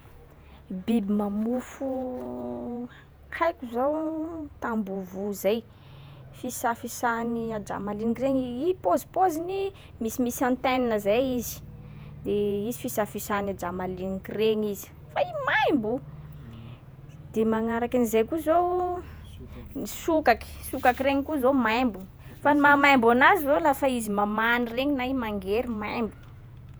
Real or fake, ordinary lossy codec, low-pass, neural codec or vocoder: fake; none; none; vocoder, 48 kHz, 128 mel bands, Vocos